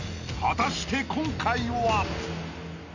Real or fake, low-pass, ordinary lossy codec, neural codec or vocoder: real; 7.2 kHz; none; none